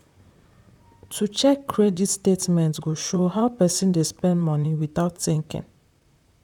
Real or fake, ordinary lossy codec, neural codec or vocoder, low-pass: fake; none; vocoder, 44.1 kHz, 128 mel bands, Pupu-Vocoder; 19.8 kHz